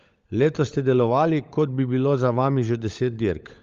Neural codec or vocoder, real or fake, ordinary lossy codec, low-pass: codec, 16 kHz, 16 kbps, FunCodec, trained on LibriTTS, 50 frames a second; fake; Opus, 32 kbps; 7.2 kHz